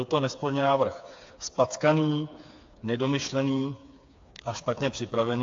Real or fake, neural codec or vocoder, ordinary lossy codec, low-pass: fake; codec, 16 kHz, 4 kbps, FreqCodec, smaller model; AAC, 48 kbps; 7.2 kHz